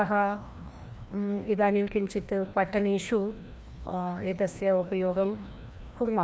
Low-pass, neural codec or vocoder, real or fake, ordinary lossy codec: none; codec, 16 kHz, 1 kbps, FreqCodec, larger model; fake; none